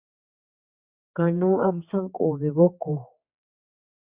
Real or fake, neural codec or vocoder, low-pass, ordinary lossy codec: fake; codec, 16 kHz, 4 kbps, X-Codec, HuBERT features, trained on general audio; 3.6 kHz; Opus, 64 kbps